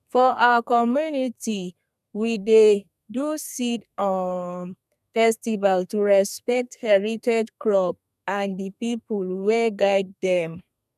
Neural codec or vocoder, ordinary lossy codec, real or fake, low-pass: codec, 32 kHz, 1.9 kbps, SNAC; none; fake; 14.4 kHz